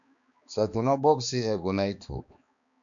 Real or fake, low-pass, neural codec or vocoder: fake; 7.2 kHz; codec, 16 kHz, 2 kbps, X-Codec, HuBERT features, trained on balanced general audio